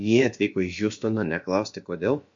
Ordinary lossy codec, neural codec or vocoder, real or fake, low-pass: MP3, 48 kbps; codec, 16 kHz, about 1 kbps, DyCAST, with the encoder's durations; fake; 7.2 kHz